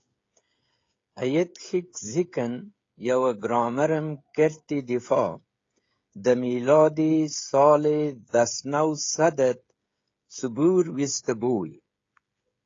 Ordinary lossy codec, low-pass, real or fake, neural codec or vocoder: AAC, 32 kbps; 7.2 kHz; fake; codec, 16 kHz, 16 kbps, FreqCodec, smaller model